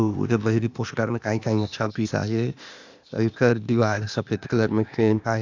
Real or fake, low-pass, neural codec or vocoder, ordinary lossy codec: fake; 7.2 kHz; codec, 16 kHz, 0.8 kbps, ZipCodec; Opus, 64 kbps